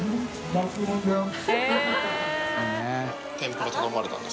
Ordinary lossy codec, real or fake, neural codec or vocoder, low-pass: none; real; none; none